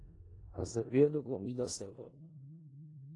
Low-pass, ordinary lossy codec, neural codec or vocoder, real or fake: 10.8 kHz; AAC, 32 kbps; codec, 16 kHz in and 24 kHz out, 0.4 kbps, LongCat-Audio-Codec, four codebook decoder; fake